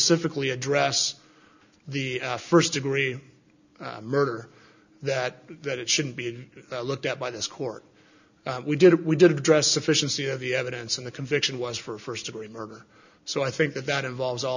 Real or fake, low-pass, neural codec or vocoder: real; 7.2 kHz; none